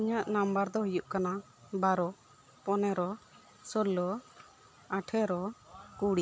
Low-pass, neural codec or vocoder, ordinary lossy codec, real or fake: none; none; none; real